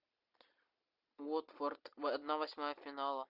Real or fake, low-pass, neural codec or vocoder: real; 5.4 kHz; none